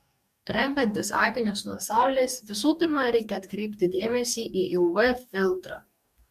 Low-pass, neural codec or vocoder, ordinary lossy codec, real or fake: 14.4 kHz; codec, 44.1 kHz, 2.6 kbps, DAC; MP3, 96 kbps; fake